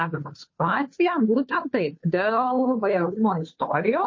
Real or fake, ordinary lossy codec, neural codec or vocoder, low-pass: fake; MP3, 32 kbps; codec, 16 kHz, 2 kbps, FunCodec, trained on Chinese and English, 25 frames a second; 7.2 kHz